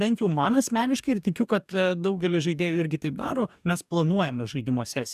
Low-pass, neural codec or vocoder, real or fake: 14.4 kHz; codec, 44.1 kHz, 2.6 kbps, DAC; fake